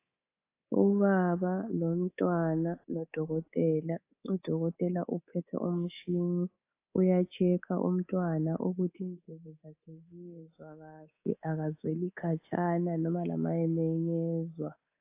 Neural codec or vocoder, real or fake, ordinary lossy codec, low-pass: codec, 24 kHz, 3.1 kbps, DualCodec; fake; AAC, 24 kbps; 3.6 kHz